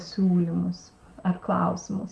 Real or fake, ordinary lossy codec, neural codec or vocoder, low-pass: real; Opus, 24 kbps; none; 10.8 kHz